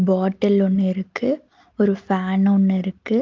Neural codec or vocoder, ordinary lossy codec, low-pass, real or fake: none; Opus, 32 kbps; 7.2 kHz; real